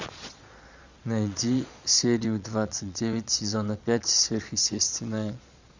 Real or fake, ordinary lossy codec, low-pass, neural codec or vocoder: fake; Opus, 64 kbps; 7.2 kHz; vocoder, 22.05 kHz, 80 mel bands, Vocos